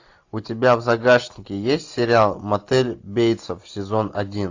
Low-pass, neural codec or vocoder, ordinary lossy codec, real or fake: 7.2 kHz; none; AAC, 48 kbps; real